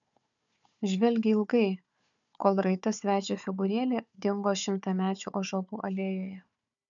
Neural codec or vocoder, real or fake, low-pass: codec, 16 kHz, 4 kbps, FunCodec, trained on Chinese and English, 50 frames a second; fake; 7.2 kHz